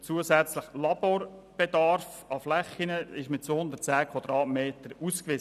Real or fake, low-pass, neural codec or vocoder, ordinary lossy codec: real; 14.4 kHz; none; none